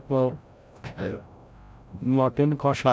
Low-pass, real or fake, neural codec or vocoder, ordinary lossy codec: none; fake; codec, 16 kHz, 0.5 kbps, FreqCodec, larger model; none